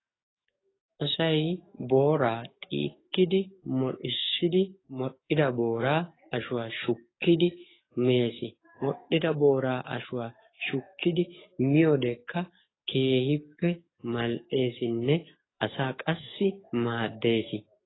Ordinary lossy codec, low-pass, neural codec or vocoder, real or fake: AAC, 16 kbps; 7.2 kHz; codec, 44.1 kHz, 7.8 kbps, DAC; fake